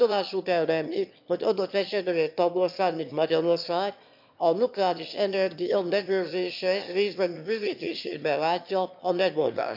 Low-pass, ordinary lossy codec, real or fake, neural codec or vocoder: 5.4 kHz; MP3, 48 kbps; fake; autoencoder, 22.05 kHz, a latent of 192 numbers a frame, VITS, trained on one speaker